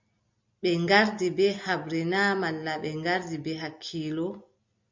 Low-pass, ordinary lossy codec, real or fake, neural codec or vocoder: 7.2 kHz; MP3, 48 kbps; real; none